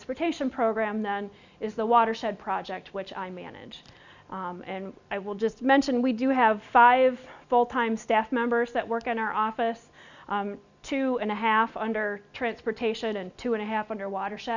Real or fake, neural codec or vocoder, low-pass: real; none; 7.2 kHz